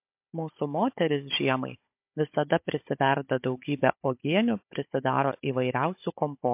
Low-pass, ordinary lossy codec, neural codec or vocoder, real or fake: 3.6 kHz; MP3, 32 kbps; codec, 16 kHz, 16 kbps, FunCodec, trained on Chinese and English, 50 frames a second; fake